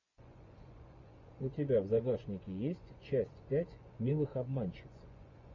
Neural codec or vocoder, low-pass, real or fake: vocoder, 24 kHz, 100 mel bands, Vocos; 7.2 kHz; fake